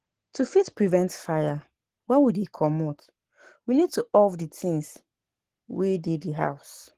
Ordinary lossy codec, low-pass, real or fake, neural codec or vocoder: Opus, 16 kbps; 14.4 kHz; fake; codec, 44.1 kHz, 7.8 kbps, Pupu-Codec